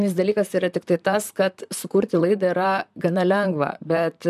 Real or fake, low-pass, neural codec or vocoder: fake; 14.4 kHz; vocoder, 44.1 kHz, 128 mel bands, Pupu-Vocoder